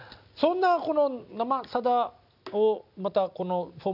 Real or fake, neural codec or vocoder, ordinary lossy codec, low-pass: real; none; none; 5.4 kHz